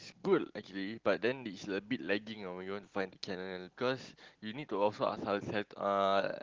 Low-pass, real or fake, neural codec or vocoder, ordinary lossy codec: 7.2 kHz; real; none; Opus, 16 kbps